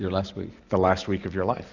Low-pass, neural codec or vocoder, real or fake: 7.2 kHz; none; real